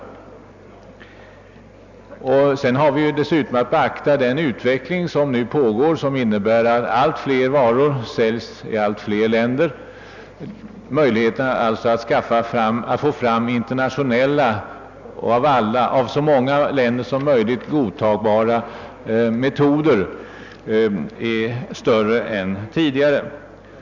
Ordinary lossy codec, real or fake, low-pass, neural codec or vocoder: none; real; 7.2 kHz; none